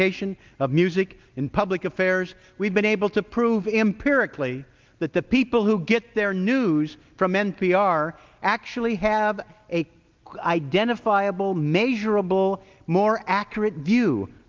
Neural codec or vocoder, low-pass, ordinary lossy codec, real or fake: none; 7.2 kHz; Opus, 32 kbps; real